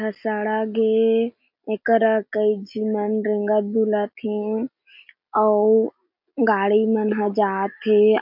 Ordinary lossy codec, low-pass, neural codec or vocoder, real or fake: MP3, 48 kbps; 5.4 kHz; none; real